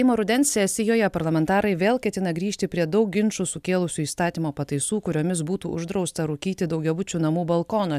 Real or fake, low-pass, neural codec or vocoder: real; 14.4 kHz; none